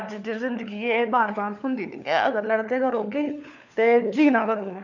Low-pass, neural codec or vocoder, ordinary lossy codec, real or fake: 7.2 kHz; codec, 16 kHz, 4 kbps, FunCodec, trained on LibriTTS, 50 frames a second; none; fake